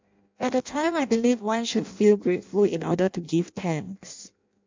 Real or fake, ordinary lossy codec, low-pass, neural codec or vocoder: fake; MP3, 64 kbps; 7.2 kHz; codec, 16 kHz in and 24 kHz out, 0.6 kbps, FireRedTTS-2 codec